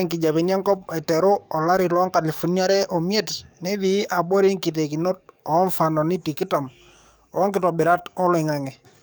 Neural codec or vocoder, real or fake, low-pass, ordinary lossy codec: codec, 44.1 kHz, 7.8 kbps, DAC; fake; none; none